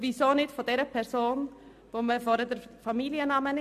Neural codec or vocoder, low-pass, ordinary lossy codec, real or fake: none; 14.4 kHz; MP3, 64 kbps; real